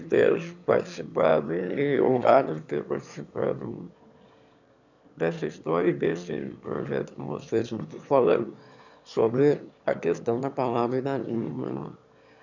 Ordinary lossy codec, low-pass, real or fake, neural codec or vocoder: none; 7.2 kHz; fake; autoencoder, 22.05 kHz, a latent of 192 numbers a frame, VITS, trained on one speaker